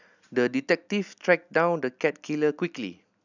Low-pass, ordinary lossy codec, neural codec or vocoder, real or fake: 7.2 kHz; none; none; real